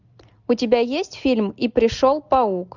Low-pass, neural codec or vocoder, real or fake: 7.2 kHz; none; real